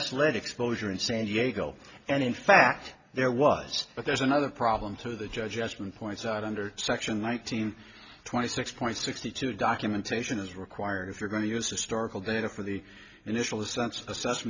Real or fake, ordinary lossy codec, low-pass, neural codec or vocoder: real; Opus, 64 kbps; 7.2 kHz; none